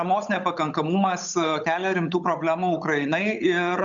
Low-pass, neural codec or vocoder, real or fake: 7.2 kHz; codec, 16 kHz, 8 kbps, FunCodec, trained on Chinese and English, 25 frames a second; fake